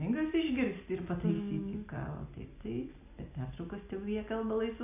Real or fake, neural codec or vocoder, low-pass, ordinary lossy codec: real; none; 3.6 kHz; AAC, 24 kbps